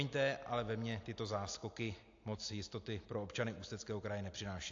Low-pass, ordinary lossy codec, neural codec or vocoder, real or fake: 7.2 kHz; AAC, 48 kbps; none; real